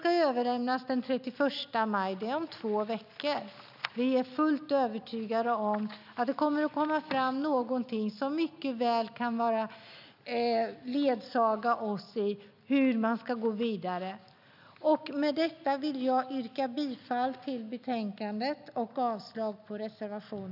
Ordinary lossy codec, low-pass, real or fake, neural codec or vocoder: none; 5.4 kHz; real; none